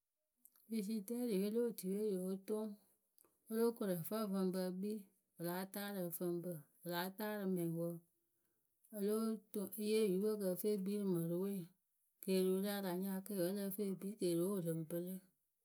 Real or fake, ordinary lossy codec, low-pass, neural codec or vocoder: real; none; none; none